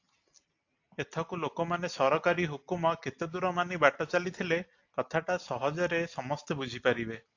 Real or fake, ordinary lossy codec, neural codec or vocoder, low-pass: real; AAC, 48 kbps; none; 7.2 kHz